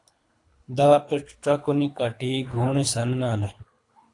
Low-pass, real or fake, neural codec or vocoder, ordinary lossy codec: 10.8 kHz; fake; codec, 24 kHz, 3 kbps, HILCodec; AAC, 48 kbps